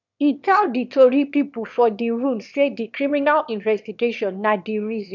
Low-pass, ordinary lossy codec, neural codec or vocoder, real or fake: 7.2 kHz; none; autoencoder, 22.05 kHz, a latent of 192 numbers a frame, VITS, trained on one speaker; fake